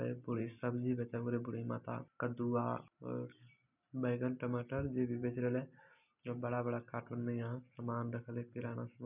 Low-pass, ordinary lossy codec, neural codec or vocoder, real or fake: 3.6 kHz; none; none; real